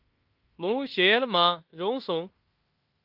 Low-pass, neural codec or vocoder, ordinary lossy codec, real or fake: 5.4 kHz; codec, 16 kHz in and 24 kHz out, 0.9 kbps, LongCat-Audio-Codec, fine tuned four codebook decoder; Opus, 24 kbps; fake